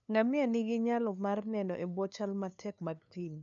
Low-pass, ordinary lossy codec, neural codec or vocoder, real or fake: 7.2 kHz; none; codec, 16 kHz, 2 kbps, FunCodec, trained on LibriTTS, 25 frames a second; fake